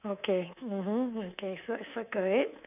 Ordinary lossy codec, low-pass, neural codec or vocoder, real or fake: none; 3.6 kHz; codec, 24 kHz, 3.1 kbps, DualCodec; fake